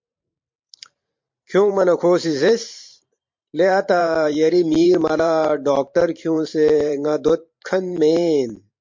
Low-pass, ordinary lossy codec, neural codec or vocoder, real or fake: 7.2 kHz; MP3, 48 kbps; vocoder, 44.1 kHz, 128 mel bands every 512 samples, BigVGAN v2; fake